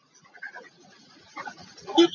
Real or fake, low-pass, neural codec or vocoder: fake; 7.2 kHz; vocoder, 44.1 kHz, 80 mel bands, Vocos